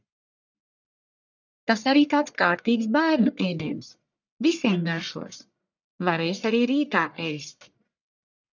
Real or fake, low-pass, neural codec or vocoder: fake; 7.2 kHz; codec, 44.1 kHz, 1.7 kbps, Pupu-Codec